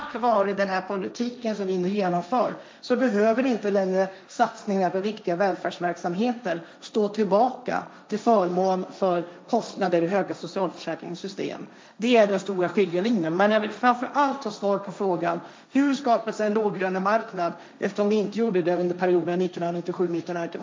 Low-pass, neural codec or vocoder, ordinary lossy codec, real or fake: none; codec, 16 kHz, 1.1 kbps, Voila-Tokenizer; none; fake